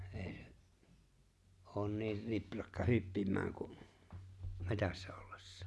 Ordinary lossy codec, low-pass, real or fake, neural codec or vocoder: none; none; real; none